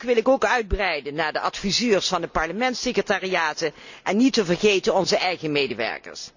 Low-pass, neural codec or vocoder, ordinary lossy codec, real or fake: 7.2 kHz; none; none; real